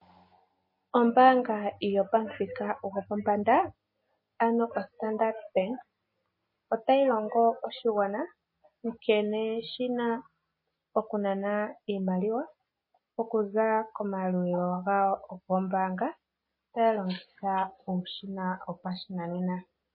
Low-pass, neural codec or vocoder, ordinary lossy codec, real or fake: 5.4 kHz; none; MP3, 24 kbps; real